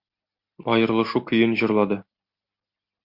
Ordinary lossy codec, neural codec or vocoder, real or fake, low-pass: MP3, 48 kbps; none; real; 5.4 kHz